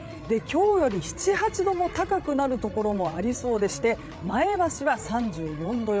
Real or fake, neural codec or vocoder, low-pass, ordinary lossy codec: fake; codec, 16 kHz, 8 kbps, FreqCodec, larger model; none; none